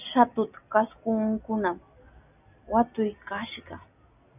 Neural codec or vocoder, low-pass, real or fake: none; 3.6 kHz; real